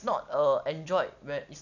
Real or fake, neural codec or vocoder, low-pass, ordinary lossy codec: real; none; 7.2 kHz; none